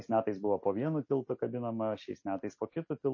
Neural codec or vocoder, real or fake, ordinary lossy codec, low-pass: none; real; MP3, 32 kbps; 7.2 kHz